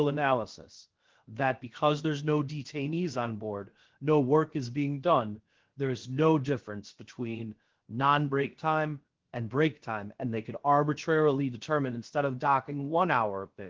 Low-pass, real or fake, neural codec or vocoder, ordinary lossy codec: 7.2 kHz; fake; codec, 16 kHz, about 1 kbps, DyCAST, with the encoder's durations; Opus, 16 kbps